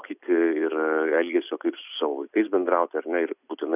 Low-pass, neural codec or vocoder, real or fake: 3.6 kHz; none; real